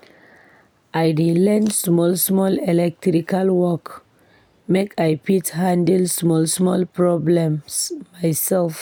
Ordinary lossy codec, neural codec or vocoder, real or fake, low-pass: none; none; real; none